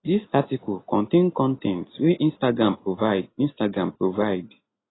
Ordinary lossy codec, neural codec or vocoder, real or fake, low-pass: AAC, 16 kbps; none; real; 7.2 kHz